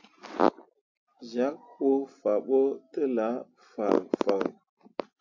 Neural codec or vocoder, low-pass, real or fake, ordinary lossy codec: none; 7.2 kHz; real; AAC, 48 kbps